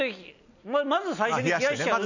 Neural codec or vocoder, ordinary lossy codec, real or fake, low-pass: none; none; real; 7.2 kHz